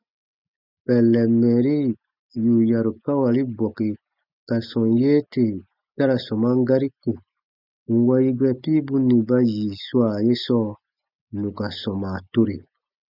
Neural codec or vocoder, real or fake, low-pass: none; real; 5.4 kHz